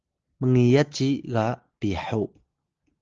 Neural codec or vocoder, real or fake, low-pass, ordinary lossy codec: none; real; 7.2 kHz; Opus, 16 kbps